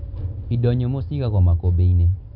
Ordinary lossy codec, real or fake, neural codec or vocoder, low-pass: none; real; none; 5.4 kHz